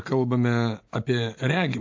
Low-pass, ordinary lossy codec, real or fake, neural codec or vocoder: 7.2 kHz; AAC, 48 kbps; real; none